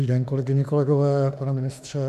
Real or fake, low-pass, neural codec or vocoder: fake; 14.4 kHz; autoencoder, 48 kHz, 32 numbers a frame, DAC-VAE, trained on Japanese speech